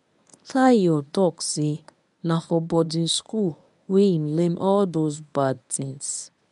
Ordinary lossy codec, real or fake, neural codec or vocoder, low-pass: none; fake; codec, 24 kHz, 0.9 kbps, WavTokenizer, medium speech release version 1; 10.8 kHz